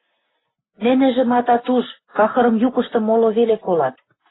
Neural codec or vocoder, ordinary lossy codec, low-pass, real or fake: none; AAC, 16 kbps; 7.2 kHz; real